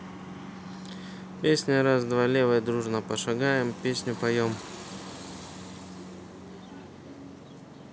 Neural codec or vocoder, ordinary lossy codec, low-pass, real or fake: none; none; none; real